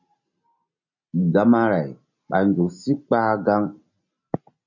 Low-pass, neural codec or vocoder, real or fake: 7.2 kHz; none; real